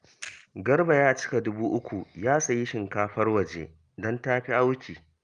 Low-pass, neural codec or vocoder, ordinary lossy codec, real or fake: 9.9 kHz; none; Opus, 32 kbps; real